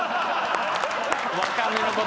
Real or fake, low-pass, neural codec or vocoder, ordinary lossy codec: real; none; none; none